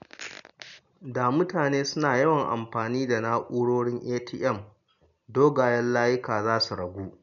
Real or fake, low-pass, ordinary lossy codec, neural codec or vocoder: real; 7.2 kHz; none; none